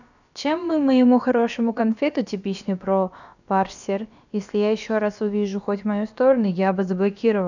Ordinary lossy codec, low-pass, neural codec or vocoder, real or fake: none; 7.2 kHz; codec, 16 kHz, about 1 kbps, DyCAST, with the encoder's durations; fake